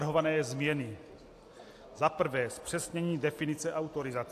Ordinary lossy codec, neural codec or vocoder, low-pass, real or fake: AAC, 64 kbps; none; 14.4 kHz; real